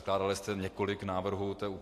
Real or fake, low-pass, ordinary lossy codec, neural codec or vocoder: real; 14.4 kHz; AAC, 64 kbps; none